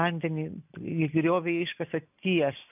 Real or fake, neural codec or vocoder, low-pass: real; none; 3.6 kHz